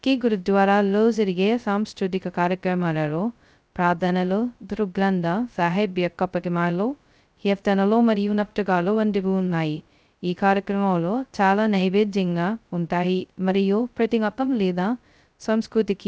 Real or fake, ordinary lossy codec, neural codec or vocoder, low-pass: fake; none; codec, 16 kHz, 0.2 kbps, FocalCodec; none